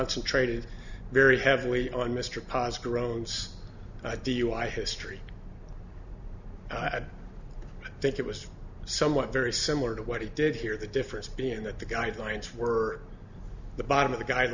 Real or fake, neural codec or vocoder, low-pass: real; none; 7.2 kHz